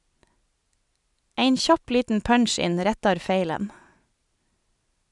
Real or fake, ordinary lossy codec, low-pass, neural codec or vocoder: real; none; 10.8 kHz; none